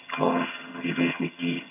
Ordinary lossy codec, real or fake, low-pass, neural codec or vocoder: MP3, 32 kbps; fake; 3.6 kHz; vocoder, 22.05 kHz, 80 mel bands, HiFi-GAN